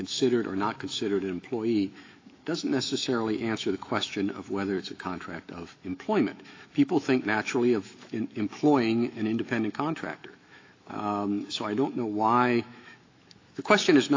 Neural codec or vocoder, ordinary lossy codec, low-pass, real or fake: autoencoder, 48 kHz, 128 numbers a frame, DAC-VAE, trained on Japanese speech; AAC, 32 kbps; 7.2 kHz; fake